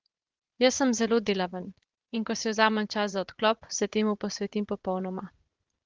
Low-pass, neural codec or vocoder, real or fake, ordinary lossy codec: 7.2 kHz; vocoder, 44.1 kHz, 80 mel bands, Vocos; fake; Opus, 16 kbps